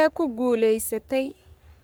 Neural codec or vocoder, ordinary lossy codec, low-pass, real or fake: codec, 44.1 kHz, 3.4 kbps, Pupu-Codec; none; none; fake